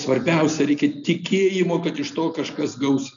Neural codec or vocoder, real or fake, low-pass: none; real; 7.2 kHz